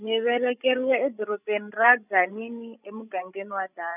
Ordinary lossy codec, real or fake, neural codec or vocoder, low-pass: none; fake; codec, 16 kHz, 16 kbps, FreqCodec, larger model; 3.6 kHz